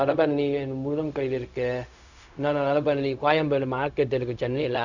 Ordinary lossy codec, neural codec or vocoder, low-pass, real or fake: none; codec, 16 kHz, 0.4 kbps, LongCat-Audio-Codec; 7.2 kHz; fake